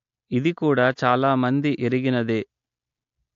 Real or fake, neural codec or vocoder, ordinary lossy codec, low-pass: real; none; AAC, 64 kbps; 7.2 kHz